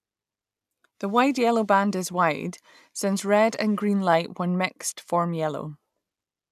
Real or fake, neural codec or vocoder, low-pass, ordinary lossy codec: real; none; 14.4 kHz; none